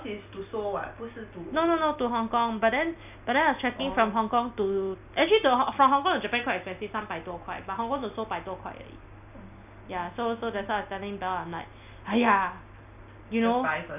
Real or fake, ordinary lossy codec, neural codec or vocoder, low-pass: real; none; none; 3.6 kHz